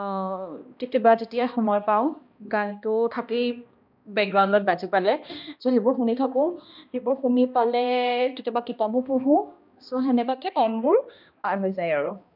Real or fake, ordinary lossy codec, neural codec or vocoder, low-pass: fake; none; codec, 16 kHz, 1 kbps, X-Codec, HuBERT features, trained on balanced general audio; 5.4 kHz